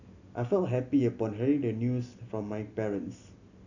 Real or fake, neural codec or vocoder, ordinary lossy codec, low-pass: real; none; none; 7.2 kHz